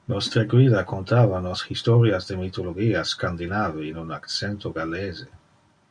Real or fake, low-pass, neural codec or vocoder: real; 9.9 kHz; none